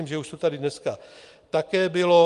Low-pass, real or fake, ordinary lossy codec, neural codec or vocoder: 10.8 kHz; real; Opus, 32 kbps; none